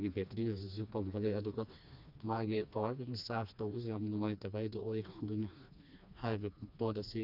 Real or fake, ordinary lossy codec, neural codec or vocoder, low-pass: fake; none; codec, 16 kHz, 2 kbps, FreqCodec, smaller model; 5.4 kHz